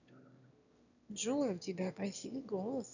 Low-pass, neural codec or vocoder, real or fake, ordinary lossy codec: 7.2 kHz; autoencoder, 22.05 kHz, a latent of 192 numbers a frame, VITS, trained on one speaker; fake; none